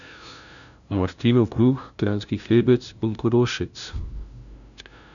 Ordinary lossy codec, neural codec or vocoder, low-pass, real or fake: none; codec, 16 kHz, 0.5 kbps, FunCodec, trained on LibriTTS, 25 frames a second; 7.2 kHz; fake